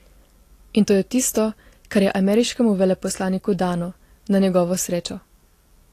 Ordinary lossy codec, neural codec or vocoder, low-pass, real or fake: AAC, 48 kbps; none; 14.4 kHz; real